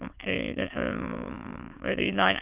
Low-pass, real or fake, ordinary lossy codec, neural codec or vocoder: 3.6 kHz; fake; Opus, 32 kbps; autoencoder, 22.05 kHz, a latent of 192 numbers a frame, VITS, trained on many speakers